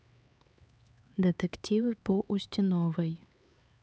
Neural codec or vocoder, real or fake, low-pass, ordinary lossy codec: codec, 16 kHz, 4 kbps, X-Codec, HuBERT features, trained on LibriSpeech; fake; none; none